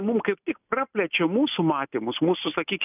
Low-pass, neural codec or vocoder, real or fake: 3.6 kHz; none; real